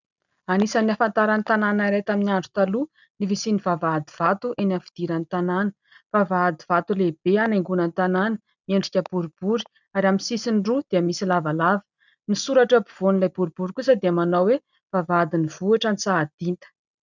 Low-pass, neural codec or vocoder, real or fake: 7.2 kHz; none; real